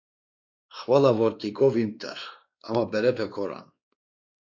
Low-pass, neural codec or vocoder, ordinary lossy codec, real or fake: 7.2 kHz; codec, 16 kHz, 2 kbps, X-Codec, WavLM features, trained on Multilingual LibriSpeech; AAC, 48 kbps; fake